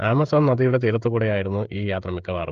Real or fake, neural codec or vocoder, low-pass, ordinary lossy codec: fake; codec, 16 kHz, 16 kbps, FreqCodec, smaller model; 7.2 kHz; Opus, 32 kbps